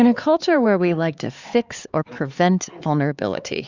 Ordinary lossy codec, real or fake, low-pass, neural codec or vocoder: Opus, 64 kbps; fake; 7.2 kHz; codec, 16 kHz, 4 kbps, X-Codec, HuBERT features, trained on LibriSpeech